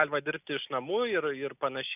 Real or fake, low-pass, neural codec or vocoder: real; 3.6 kHz; none